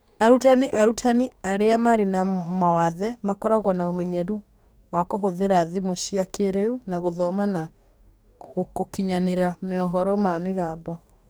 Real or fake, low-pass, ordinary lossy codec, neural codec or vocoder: fake; none; none; codec, 44.1 kHz, 2.6 kbps, DAC